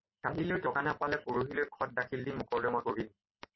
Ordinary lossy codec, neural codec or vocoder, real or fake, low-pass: MP3, 24 kbps; none; real; 7.2 kHz